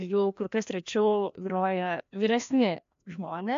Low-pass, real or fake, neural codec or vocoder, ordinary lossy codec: 7.2 kHz; fake; codec, 16 kHz, 1 kbps, FreqCodec, larger model; AAC, 64 kbps